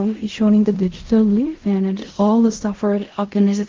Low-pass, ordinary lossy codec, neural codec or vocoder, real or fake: 7.2 kHz; Opus, 32 kbps; codec, 16 kHz in and 24 kHz out, 0.4 kbps, LongCat-Audio-Codec, fine tuned four codebook decoder; fake